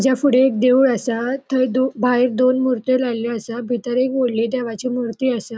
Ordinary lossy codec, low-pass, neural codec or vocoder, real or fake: none; none; none; real